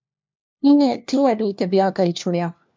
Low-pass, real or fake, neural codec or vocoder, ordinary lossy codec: 7.2 kHz; fake; codec, 16 kHz, 1 kbps, FunCodec, trained on LibriTTS, 50 frames a second; MP3, 64 kbps